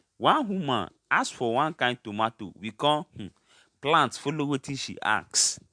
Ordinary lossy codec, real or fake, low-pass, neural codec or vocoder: MP3, 64 kbps; real; 9.9 kHz; none